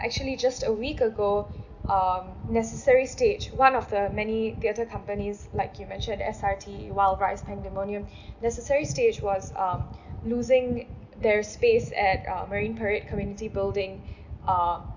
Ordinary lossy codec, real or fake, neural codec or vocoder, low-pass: none; real; none; 7.2 kHz